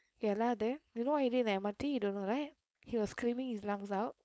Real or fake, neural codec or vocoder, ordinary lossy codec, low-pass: fake; codec, 16 kHz, 4.8 kbps, FACodec; none; none